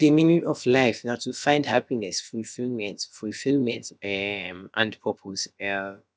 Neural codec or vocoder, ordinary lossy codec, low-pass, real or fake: codec, 16 kHz, about 1 kbps, DyCAST, with the encoder's durations; none; none; fake